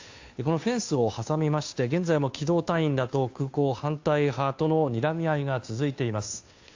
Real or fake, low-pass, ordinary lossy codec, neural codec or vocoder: fake; 7.2 kHz; AAC, 48 kbps; codec, 16 kHz, 2 kbps, FunCodec, trained on Chinese and English, 25 frames a second